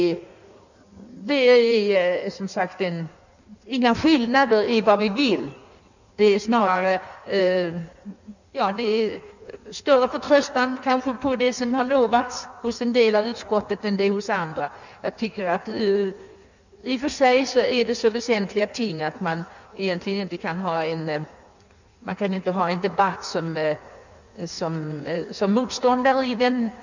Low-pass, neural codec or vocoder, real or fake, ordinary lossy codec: 7.2 kHz; codec, 16 kHz in and 24 kHz out, 1.1 kbps, FireRedTTS-2 codec; fake; none